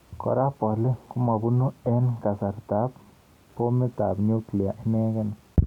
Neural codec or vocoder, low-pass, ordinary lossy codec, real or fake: none; 19.8 kHz; none; real